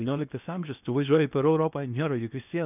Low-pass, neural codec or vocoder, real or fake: 3.6 kHz; codec, 16 kHz in and 24 kHz out, 0.8 kbps, FocalCodec, streaming, 65536 codes; fake